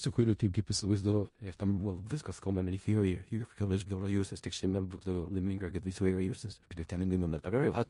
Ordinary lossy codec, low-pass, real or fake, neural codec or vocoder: MP3, 48 kbps; 10.8 kHz; fake; codec, 16 kHz in and 24 kHz out, 0.4 kbps, LongCat-Audio-Codec, four codebook decoder